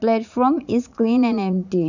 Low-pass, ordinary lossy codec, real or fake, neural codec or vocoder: 7.2 kHz; none; fake; vocoder, 44.1 kHz, 80 mel bands, Vocos